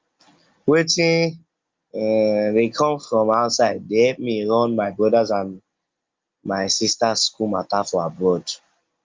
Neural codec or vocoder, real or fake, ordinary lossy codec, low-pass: none; real; Opus, 32 kbps; 7.2 kHz